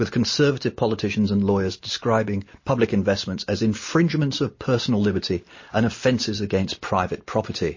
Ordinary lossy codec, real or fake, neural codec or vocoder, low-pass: MP3, 32 kbps; real; none; 7.2 kHz